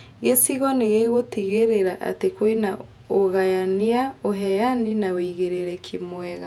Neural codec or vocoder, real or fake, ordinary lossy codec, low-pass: vocoder, 48 kHz, 128 mel bands, Vocos; fake; none; 19.8 kHz